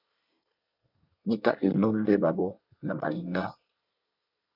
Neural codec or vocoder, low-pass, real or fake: codec, 24 kHz, 1 kbps, SNAC; 5.4 kHz; fake